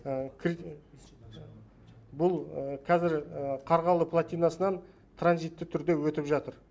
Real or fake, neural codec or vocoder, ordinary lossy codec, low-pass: real; none; none; none